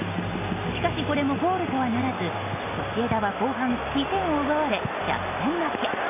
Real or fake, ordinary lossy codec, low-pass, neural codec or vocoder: real; none; 3.6 kHz; none